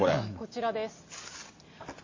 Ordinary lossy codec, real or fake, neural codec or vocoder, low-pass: MP3, 32 kbps; real; none; 7.2 kHz